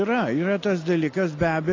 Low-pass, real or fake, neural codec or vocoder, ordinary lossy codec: 7.2 kHz; real; none; AAC, 32 kbps